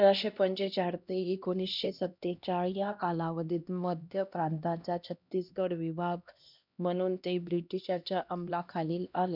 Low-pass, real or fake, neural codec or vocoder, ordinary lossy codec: 5.4 kHz; fake; codec, 16 kHz, 1 kbps, X-Codec, HuBERT features, trained on LibriSpeech; none